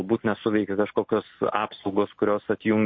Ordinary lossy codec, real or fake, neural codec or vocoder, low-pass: MP3, 32 kbps; real; none; 7.2 kHz